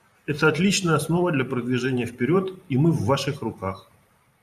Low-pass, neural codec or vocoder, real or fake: 14.4 kHz; vocoder, 44.1 kHz, 128 mel bands every 512 samples, BigVGAN v2; fake